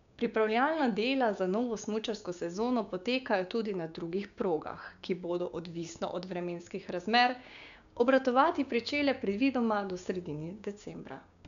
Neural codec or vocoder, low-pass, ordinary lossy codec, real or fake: codec, 16 kHz, 6 kbps, DAC; 7.2 kHz; none; fake